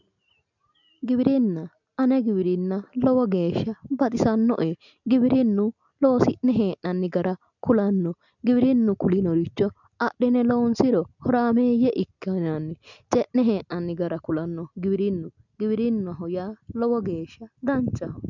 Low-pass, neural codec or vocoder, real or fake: 7.2 kHz; none; real